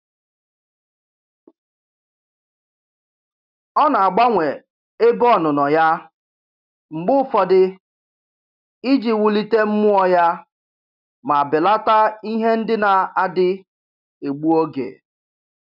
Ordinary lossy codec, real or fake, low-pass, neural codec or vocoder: none; real; 5.4 kHz; none